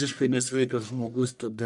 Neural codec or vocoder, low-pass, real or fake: codec, 44.1 kHz, 1.7 kbps, Pupu-Codec; 10.8 kHz; fake